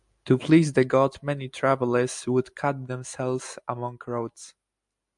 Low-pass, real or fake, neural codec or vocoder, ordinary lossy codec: 10.8 kHz; real; none; MP3, 96 kbps